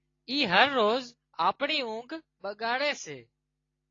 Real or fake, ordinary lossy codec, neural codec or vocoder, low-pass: real; AAC, 32 kbps; none; 7.2 kHz